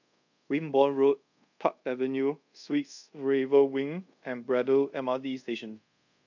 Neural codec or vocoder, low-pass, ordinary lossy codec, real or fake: codec, 24 kHz, 0.5 kbps, DualCodec; 7.2 kHz; none; fake